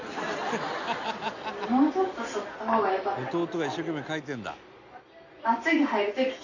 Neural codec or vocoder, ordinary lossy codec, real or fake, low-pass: none; none; real; 7.2 kHz